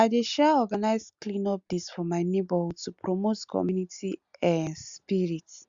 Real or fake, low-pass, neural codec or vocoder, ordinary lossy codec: real; 7.2 kHz; none; Opus, 64 kbps